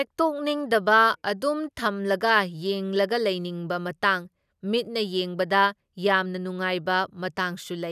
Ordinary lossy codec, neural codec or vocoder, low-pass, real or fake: none; none; 14.4 kHz; real